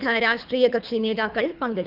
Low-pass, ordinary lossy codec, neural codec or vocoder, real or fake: 5.4 kHz; none; codec, 24 kHz, 3 kbps, HILCodec; fake